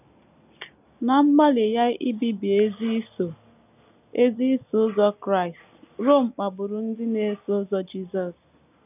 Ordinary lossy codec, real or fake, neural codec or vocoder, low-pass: none; real; none; 3.6 kHz